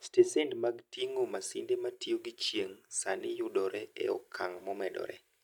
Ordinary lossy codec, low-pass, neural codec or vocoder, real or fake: none; 19.8 kHz; none; real